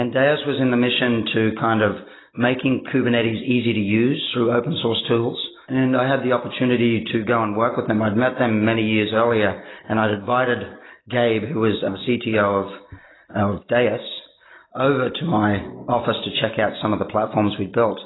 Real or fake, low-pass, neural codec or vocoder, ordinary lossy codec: real; 7.2 kHz; none; AAC, 16 kbps